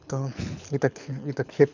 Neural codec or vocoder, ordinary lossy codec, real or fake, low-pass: codec, 24 kHz, 6 kbps, HILCodec; none; fake; 7.2 kHz